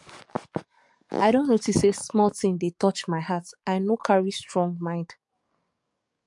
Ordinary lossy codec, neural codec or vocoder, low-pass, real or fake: MP3, 64 kbps; codec, 44.1 kHz, 7.8 kbps, DAC; 10.8 kHz; fake